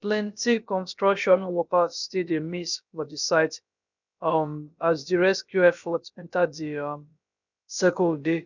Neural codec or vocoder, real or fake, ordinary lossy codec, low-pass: codec, 16 kHz, about 1 kbps, DyCAST, with the encoder's durations; fake; none; 7.2 kHz